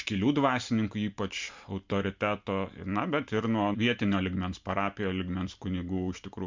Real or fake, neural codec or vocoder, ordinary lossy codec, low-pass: real; none; MP3, 64 kbps; 7.2 kHz